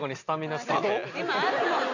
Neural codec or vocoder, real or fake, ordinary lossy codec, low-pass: none; real; none; 7.2 kHz